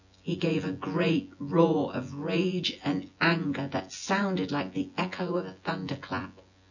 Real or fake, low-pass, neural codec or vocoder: fake; 7.2 kHz; vocoder, 24 kHz, 100 mel bands, Vocos